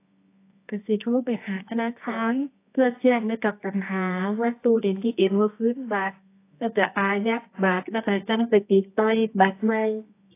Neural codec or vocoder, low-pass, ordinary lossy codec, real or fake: codec, 24 kHz, 0.9 kbps, WavTokenizer, medium music audio release; 3.6 kHz; AAC, 24 kbps; fake